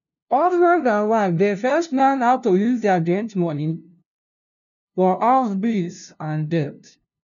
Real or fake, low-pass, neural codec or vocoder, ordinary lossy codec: fake; 7.2 kHz; codec, 16 kHz, 0.5 kbps, FunCodec, trained on LibriTTS, 25 frames a second; none